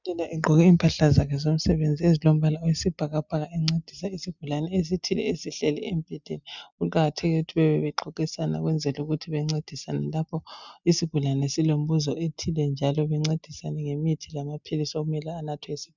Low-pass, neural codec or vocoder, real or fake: 7.2 kHz; none; real